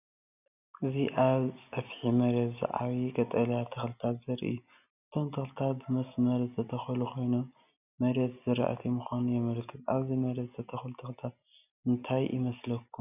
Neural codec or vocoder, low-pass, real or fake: none; 3.6 kHz; real